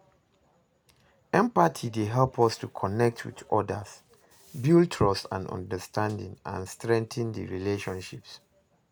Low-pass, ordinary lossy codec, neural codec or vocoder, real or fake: none; none; none; real